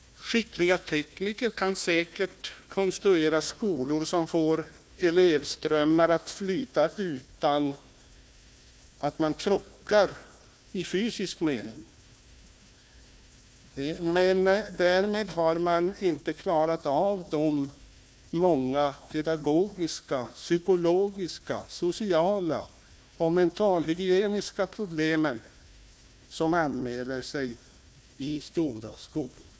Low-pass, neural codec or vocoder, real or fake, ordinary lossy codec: none; codec, 16 kHz, 1 kbps, FunCodec, trained on Chinese and English, 50 frames a second; fake; none